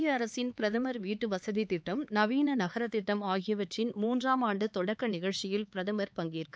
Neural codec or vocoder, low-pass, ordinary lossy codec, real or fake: codec, 16 kHz, 4 kbps, X-Codec, HuBERT features, trained on LibriSpeech; none; none; fake